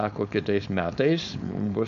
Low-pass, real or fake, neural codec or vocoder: 7.2 kHz; fake; codec, 16 kHz, 4.8 kbps, FACodec